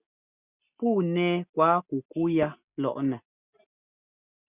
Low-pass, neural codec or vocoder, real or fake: 3.6 kHz; none; real